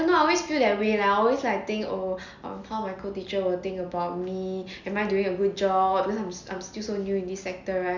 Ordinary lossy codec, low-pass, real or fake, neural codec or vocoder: none; 7.2 kHz; real; none